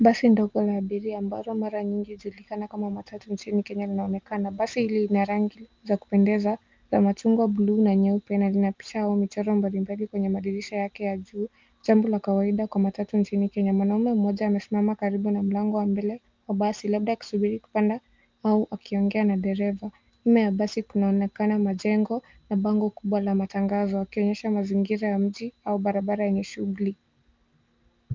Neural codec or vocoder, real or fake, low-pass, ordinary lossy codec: none; real; 7.2 kHz; Opus, 24 kbps